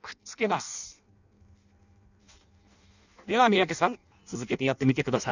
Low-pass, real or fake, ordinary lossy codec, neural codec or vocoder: 7.2 kHz; fake; none; codec, 16 kHz in and 24 kHz out, 0.6 kbps, FireRedTTS-2 codec